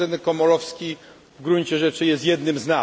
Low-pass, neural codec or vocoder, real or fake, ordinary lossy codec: none; none; real; none